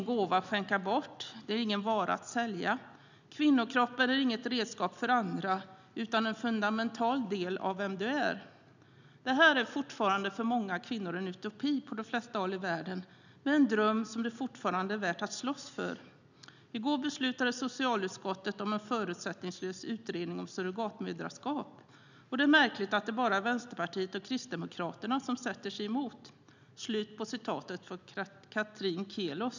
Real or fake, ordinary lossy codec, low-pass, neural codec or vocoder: real; none; 7.2 kHz; none